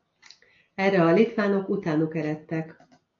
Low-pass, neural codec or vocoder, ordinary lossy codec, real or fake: 7.2 kHz; none; AAC, 48 kbps; real